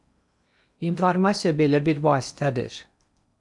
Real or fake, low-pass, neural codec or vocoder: fake; 10.8 kHz; codec, 16 kHz in and 24 kHz out, 0.8 kbps, FocalCodec, streaming, 65536 codes